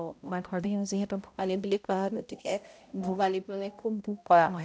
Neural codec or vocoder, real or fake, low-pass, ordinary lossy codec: codec, 16 kHz, 0.5 kbps, X-Codec, HuBERT features, trained on balanced general audio; fake; none; none